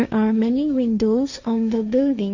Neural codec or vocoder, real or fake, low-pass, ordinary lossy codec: codec, 16 kHz, 1.1 kbps, Voila-Tokenizer; fake; 7.2 kHz; none